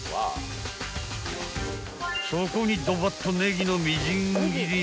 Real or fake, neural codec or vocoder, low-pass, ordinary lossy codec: real; none; none; none